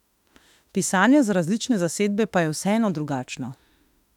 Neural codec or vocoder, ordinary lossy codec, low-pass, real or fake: autoencoder, 48 kHz, 32 numbers a frame, DAC-VAE, trained on Japanese speech; none; 19.8 kHz; fake